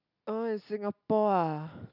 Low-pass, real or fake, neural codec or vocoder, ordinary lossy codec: 5.4 kHz; real; none; none